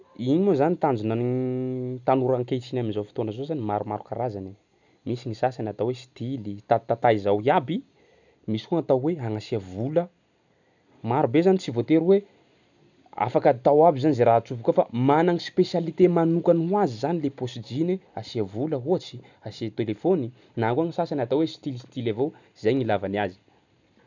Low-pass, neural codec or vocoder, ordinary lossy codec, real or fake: 7.2 kHz; none; none; real